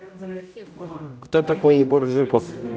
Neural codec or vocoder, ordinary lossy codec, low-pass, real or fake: codec, 16 kHz, 0.5 kbps, X-Codec, HuBERT features, trained on general audio; none; none; fake